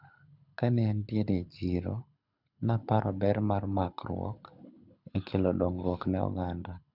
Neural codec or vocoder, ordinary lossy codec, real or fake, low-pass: codec, 24 kHz, 6 kbps, HILCodec; none; fake; 5.4 kHz